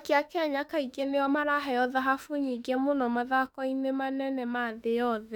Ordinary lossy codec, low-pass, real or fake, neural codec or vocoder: none; 19.8 kHz; fake; autoencoder, 48 kHz, 32 numbers a frame, DAC-VAE, trained on Japanese speech